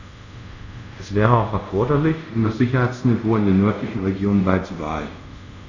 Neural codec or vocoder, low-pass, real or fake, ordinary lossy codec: codec, 24 kHz, 0.5 kbps, DualCodec; 7.2 kHz; fake; none